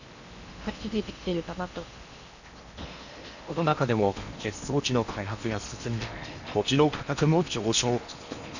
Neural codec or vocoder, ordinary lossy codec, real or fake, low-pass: codec, 16 kHz in and 24 kHz out, 0.8 kbps, FocalCodec, streaming, 65536 codes; none; fake; 7.2 kHz